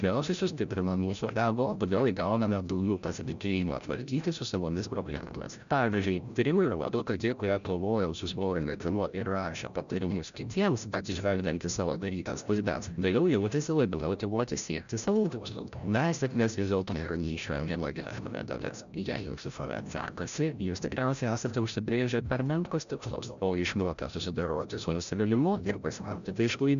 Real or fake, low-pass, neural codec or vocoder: fake; 7.2 kHz; codec, 16 kHz, 0.5 kbps, FreqCodec, larger model